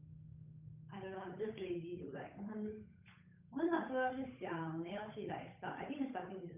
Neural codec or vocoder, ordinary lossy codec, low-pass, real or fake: codec, 16 kHz, 8 kbps, FunCodec, trained on Chinese and English, 25 frames a second; none; 3.6 kHz; fake